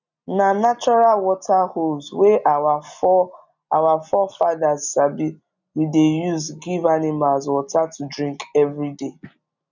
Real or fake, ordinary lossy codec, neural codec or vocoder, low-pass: real; none; none; 7.2 kHz